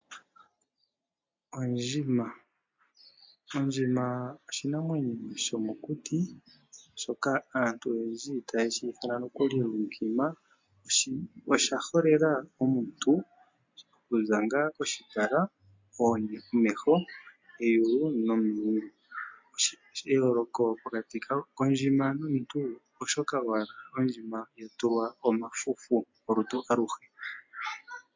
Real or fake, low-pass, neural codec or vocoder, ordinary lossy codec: real; 7.2 kHz; none; MP3, 48 kbps